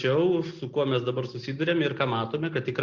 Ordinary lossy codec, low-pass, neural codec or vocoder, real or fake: Opus, 64 kbps; 7.2 kHz; none; real